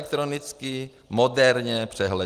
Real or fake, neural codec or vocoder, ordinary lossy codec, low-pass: real; none; Opus, 24 kbps; 14.4 kHz